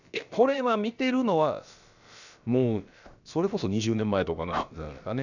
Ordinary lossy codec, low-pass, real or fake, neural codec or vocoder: none; 7.2 kHz; fake; codec, 16 kHz, about 1 kbps, DyCAST, with the encoder's durations